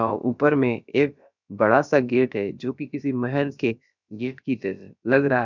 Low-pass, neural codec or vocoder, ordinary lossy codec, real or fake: 7.2 kHz; codec, 16 kHz, about 1 kbps, DyCAST, with the encoder's durations; none; fake